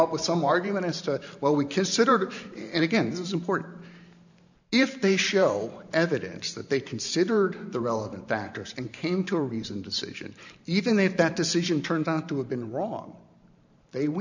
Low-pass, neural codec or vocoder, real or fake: 7.2 kHz; vocoder, 44.1 kHz, 128 mel bands every 512 samples, BigVGAN v2; fake